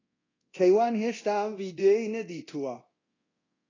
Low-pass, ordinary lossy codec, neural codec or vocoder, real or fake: 7.2 kHz; AAC, 32 kbps; codec, 24 kHz, 0.9 kbps, DualCodec; fake